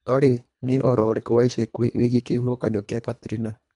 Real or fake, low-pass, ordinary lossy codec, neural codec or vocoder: fake; 10.8 kHz; none; codec, 24 kHz, 1.5 kbps, HILCodec